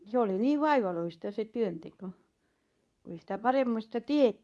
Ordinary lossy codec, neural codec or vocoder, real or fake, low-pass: none; codec, 24 kHz, 0.9 kbps, WavTokenizer, medium speech release version 2; fake; none